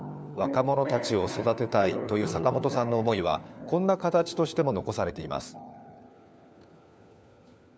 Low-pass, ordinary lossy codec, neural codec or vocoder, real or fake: none; none; codec, 16 kHz, 4 kbps, FunCodec, trained on LibriTTS, 50 frames a second; fake